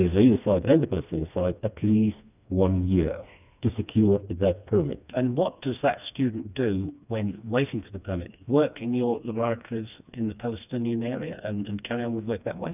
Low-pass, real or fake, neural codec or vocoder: 3.6 kHz; fake; codec, 16 kHz, 2 kbps, FreqCodec, smaller model